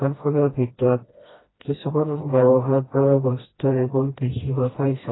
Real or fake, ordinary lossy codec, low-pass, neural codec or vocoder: fake; AAC, 16 kbps; 7.2 kHz; codec, 16 kHz, 1 kbps, FreqCodec, smaller model